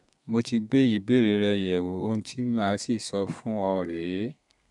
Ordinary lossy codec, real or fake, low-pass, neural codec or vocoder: none; fake; 10.8 kHz; codec, 32 kHz, 1.9 kbps, SNAC